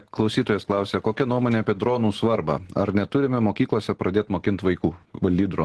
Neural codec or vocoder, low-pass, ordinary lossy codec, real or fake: none; 10.8 kHz; Opus, 16 kbps; real